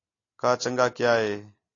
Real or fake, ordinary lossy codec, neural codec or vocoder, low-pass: real; AAC, 32 kbps; none; 7.2 kHz